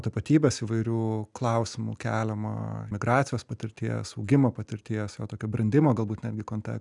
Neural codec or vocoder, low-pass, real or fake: none; 10.8 kHz; real